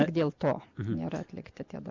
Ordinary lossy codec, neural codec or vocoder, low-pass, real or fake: Opus, 64 kbps; none; 7.2 kHz; real